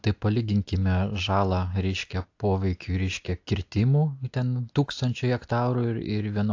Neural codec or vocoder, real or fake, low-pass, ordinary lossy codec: none; real; 7.2 kHz; AAC, 48 kbps